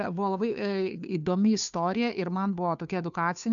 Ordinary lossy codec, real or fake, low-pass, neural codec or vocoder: MP3, 96 kbps; fake; 7.2 kHz; codec, 16 kHz, 2 kbps, FunCodec, trained on Chinese and English, 25 frames a second